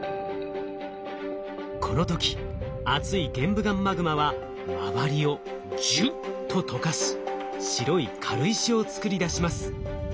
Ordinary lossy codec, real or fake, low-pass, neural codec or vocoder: none; real; none; none